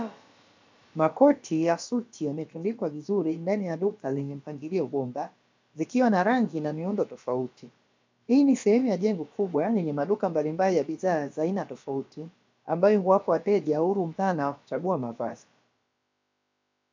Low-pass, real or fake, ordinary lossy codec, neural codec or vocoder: 7.2 kHz; fake; MP3, 64 kbps; codec, 16 kHz, about 1 kbps, DyCAST, with the encoder's durations